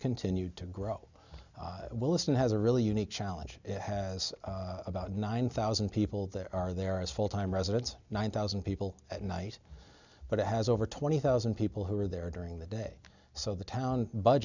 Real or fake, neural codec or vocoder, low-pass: real; none; 7.2 kHz